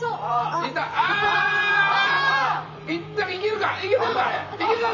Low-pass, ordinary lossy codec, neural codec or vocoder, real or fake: 7.2 kHz; none; vocoder, 44.1 kHz, 128 mel bands, Pupu-Vocoder; fake